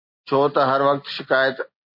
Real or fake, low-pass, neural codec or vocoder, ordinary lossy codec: real; 5.4 kHz; none; MP3, 32 kbps